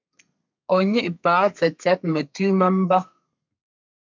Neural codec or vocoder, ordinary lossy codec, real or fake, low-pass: codec, 32 kHz, 1.9 kbps, SNAC; MP3, 64 kbps; fake; 7.2 kHz